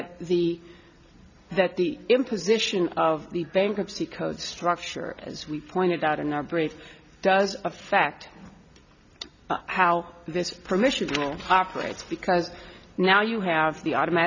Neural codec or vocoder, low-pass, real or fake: none; 7.2 kHz; real